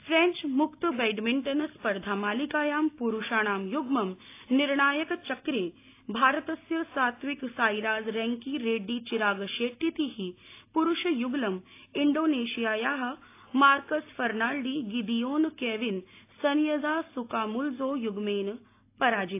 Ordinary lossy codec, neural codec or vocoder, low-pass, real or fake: AAC, 24 kbps; none; 3.6 kHz; real